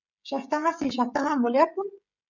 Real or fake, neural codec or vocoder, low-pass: fake; codec, 16 kHz, 16 kbps, FreqCodec, smaller model; 7.2 kHz